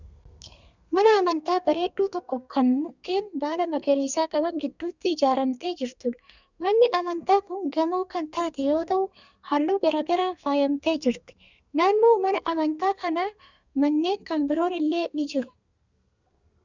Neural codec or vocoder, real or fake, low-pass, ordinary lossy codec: codec, 32 kHz, 1.9 kbps, SNAC; fake; 7.2 kHz; Opus, 64 kbps